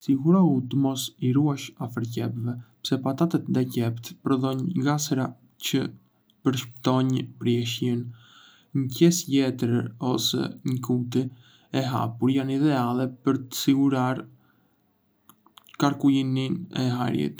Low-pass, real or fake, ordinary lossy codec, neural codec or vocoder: none; real; none; none